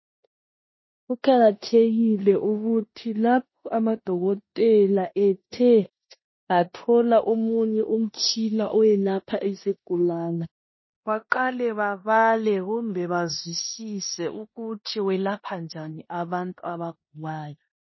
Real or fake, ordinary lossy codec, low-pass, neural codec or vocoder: fake; MP3, 24 kbps; 7.2 kHz; codec, 16 kHz in and 24 kHz out, 0.9 kbps, LongCat-Audio-Codec, four codebook decoder